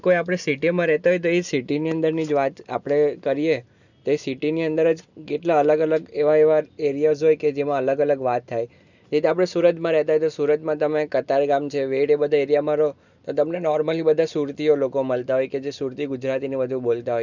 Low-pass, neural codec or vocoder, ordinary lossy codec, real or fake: 7.2 kHz; none; none; real